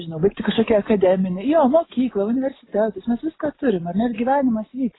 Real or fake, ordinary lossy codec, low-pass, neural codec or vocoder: real; AAC, 16 kbps; 7.2 kHz; none